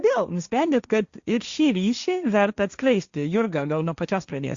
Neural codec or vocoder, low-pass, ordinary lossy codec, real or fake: codec, 16 kHz, 1.1 kbps, Voila-Tokenizer; 7.2 kHz; Opus, 64 kbps; fake